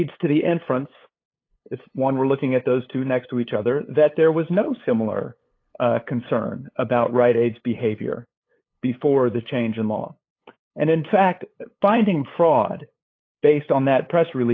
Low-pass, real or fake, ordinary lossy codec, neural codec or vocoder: 7.2 kHz; fake; AAC, 32 kbps; codec, 16 kHz, 8 kbps, FunCodec, trained on LibriTTS, 25 frames a second